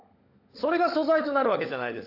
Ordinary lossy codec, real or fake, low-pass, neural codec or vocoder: AAC, 32 kbps; fake; 5.4 kHz; codec, 16 kHz, 16 kbps, FunCodec, trained on LibriTTS, 50 frames a second